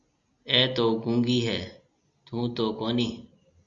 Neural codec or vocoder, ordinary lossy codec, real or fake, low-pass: none; Opus, 64 kbps; real; 7.2 kHz